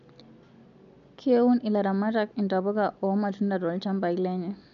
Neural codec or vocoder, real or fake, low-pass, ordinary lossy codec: none; real; 7.2 kHz; none